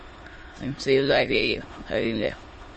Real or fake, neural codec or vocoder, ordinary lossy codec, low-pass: fake; autoencoder, 22.05 kHz, a latent of 192 numbers a frame, VITS, trained on many speakers; MP3, 32 kbps; 9.9 kHz